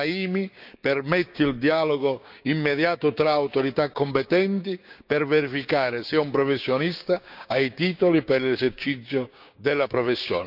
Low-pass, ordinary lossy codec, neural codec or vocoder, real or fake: 5.4 kHz; none; codec, 16 kHz, 6 kbps, DAC; fake